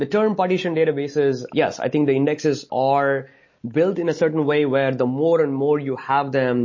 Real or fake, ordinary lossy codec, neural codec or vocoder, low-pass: real; MP3, 32 kbps; none; 7.2 kHz